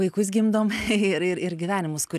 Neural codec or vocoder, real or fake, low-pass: none; real; 14.4 kHz